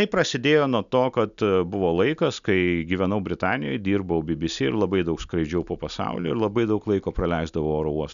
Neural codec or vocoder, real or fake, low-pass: none; real; 7.2 kHz